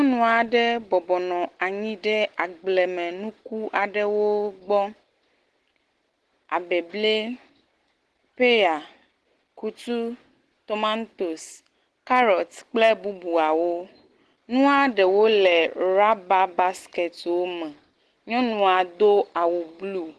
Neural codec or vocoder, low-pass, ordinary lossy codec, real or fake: none; 10.8 kHz; Opus, 16 kbps; real